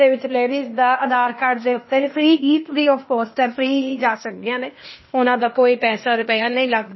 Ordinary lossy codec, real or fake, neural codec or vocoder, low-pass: MP3, 24 kbps; fake; codec, 16 kHz, 0.8 kbps, ZipCodec; 7.2 kHz